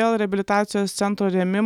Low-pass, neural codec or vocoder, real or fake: 19.8 kHz; none; real